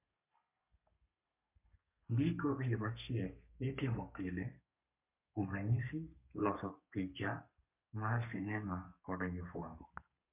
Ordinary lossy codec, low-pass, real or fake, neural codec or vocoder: MP3, 32 kbps; 3.6 kHz; fake; codec, 32 kHz, 1.9 kbps, SNAC